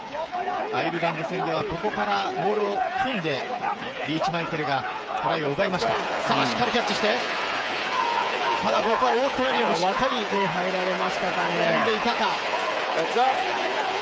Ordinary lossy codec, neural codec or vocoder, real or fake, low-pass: none; codec, 16 kHz, 8 kbps, FreqCodec, smaller model; fake; none